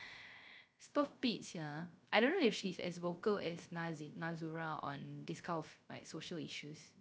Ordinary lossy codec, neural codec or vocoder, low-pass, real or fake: none; codec, 16 kHz, 0.3 kbps, FocalCodec; none; fake